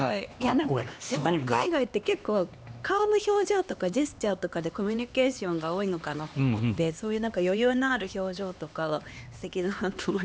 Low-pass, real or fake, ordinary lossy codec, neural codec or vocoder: none; fake; none; codec, 16 kHz, 2 kbps, X-Codec, HuBERT features, trained on LibriSpeech